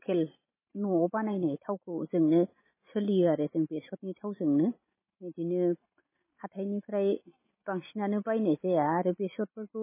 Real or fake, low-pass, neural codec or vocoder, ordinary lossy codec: real; 3.6 kHz; none; MP3, 16 kbps